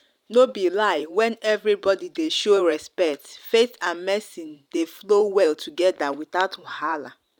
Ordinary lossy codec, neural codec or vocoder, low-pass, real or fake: none; vocoder, 44.1 kHz, 128 mel bands every 512 samples, BigVGAN v2; 19.8 kHz; fake